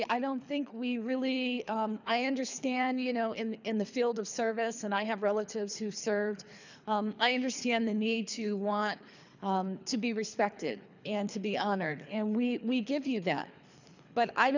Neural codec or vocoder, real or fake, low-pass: codec, 24 kHz, 3 kbps, HILCodec; fake; 7.2 kHz